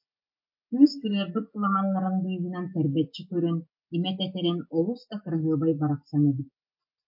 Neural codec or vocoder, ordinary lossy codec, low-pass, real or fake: none; MP3, 32 kbps; 5.4 kHz; real